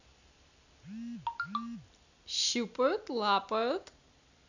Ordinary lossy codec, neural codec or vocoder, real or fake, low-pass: none; none; real; 7.2 kHz